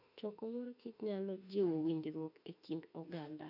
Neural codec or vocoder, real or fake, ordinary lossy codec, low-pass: autoencoder, 48 kHz, 32 numbers a frame, DAC-VAE, trained on Japanese speech; fake; none; 5.4 kHz